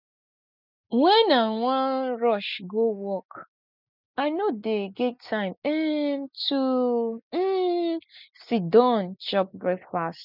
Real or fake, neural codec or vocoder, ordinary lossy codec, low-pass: real; none; none; 5.4 kHz